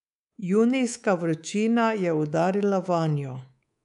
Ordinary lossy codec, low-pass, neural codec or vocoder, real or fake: none; 10.8 kHz; codec, 24 kHz, 3.1 kbps, DualCodec; fake